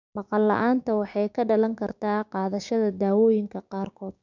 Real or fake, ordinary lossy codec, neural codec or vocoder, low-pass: fake; none; codec, 16 kHz, 6 kbps, DAC; 7.2 kHz